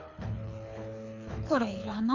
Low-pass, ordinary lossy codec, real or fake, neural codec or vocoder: 7.2 kHz; none; fake; codec, 24 kHz, 6 kbps, HILCodec